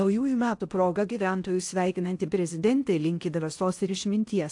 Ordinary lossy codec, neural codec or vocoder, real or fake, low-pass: AAC, 64 kbps; codec, 16 kHz in and 24 kHz out, 0.6 kbps, FocalCodec, streaming, 4096 codes; fake; 10.8 kHz